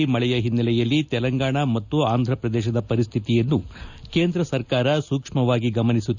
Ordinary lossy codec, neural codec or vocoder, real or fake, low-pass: none; none; real; 7.2 kHz